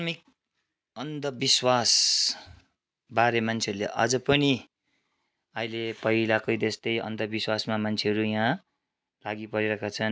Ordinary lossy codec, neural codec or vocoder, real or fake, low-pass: none; none; real; none